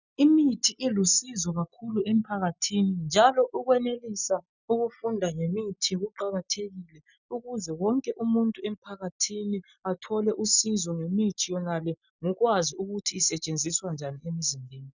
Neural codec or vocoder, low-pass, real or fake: none; 7.2 kHz; real